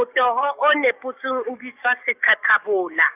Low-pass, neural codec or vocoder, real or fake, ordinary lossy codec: 3.6 kHz; codec, 44.1 kHz, 7.8 kbps, DAC; fake; none